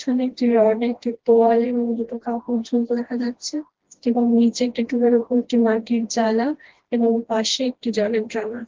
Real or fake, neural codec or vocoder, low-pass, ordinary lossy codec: fake; codec, 16 kHz, 1 kbps, FreqCodec, smaller model; 7.2 kHz; Opus, 16 kbps